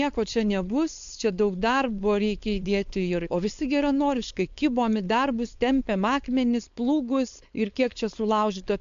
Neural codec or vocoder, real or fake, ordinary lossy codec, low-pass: codec, 16 kHz, 4.8 kbps, FACodec; fake; MP3, 64 kbps; 7.2 kHz